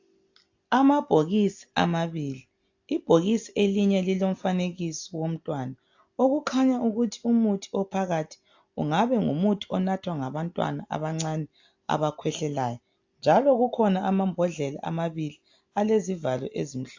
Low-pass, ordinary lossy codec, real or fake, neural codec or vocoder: 7.2 kHz; AAC, 48 kbps; real; none